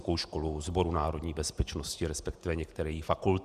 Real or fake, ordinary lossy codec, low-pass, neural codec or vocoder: fake; Opus, 64 kbps; 14.4 kHz; vocoder, 48 kHz, 128 mel bands, Vocos